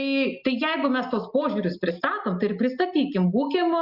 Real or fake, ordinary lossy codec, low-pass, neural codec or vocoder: real; Opus, 64 kbps; 5.4 kHz; none